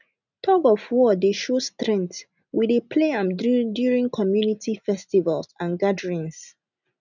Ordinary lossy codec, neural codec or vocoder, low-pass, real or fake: none; none; 7.2 kHz; real